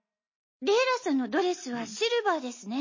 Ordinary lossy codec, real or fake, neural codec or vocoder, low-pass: MP3, 32 kbps; real; none; 7.2 kHz